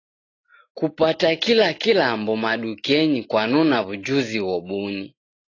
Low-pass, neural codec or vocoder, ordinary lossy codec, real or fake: 7.2 kHz; none; AAC, 32 kbps; real